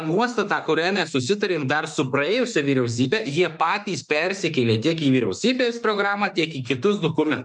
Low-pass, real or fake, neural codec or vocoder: 10.8 kHz; fake; autoencoder, 48 kHz, 32 numbers a frame, DAC-VAE, trained on Japanese speech